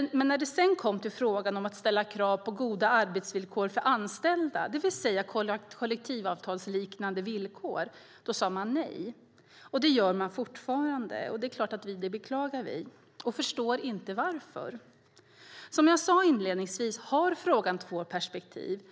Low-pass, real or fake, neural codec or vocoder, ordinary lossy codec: none; real; none; none